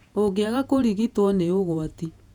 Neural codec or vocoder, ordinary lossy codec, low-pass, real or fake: vocoder, 48 kHz, 128 mel bands, Vocos; none; 19.8 kHz; fake